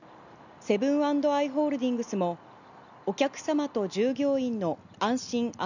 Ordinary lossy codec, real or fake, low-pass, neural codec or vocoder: none; real; 7.2 kHz; none